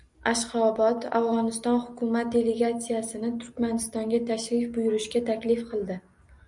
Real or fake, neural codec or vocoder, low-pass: real; none; 10.8 kHz